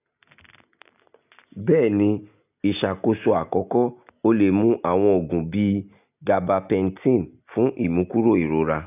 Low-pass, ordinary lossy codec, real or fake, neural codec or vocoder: 3.6 kHz; none; real; none